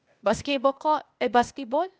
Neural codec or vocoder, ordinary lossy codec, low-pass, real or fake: codec, 16 kHz, 0.8 kbps, ZipCodec; none; none; fake